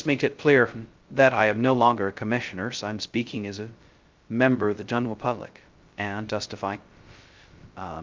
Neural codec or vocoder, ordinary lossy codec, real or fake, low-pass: codec, 16 kHz, 0.2 kbps, FocalCodec; Opus, 24 kbps; fake; 7.2 kHz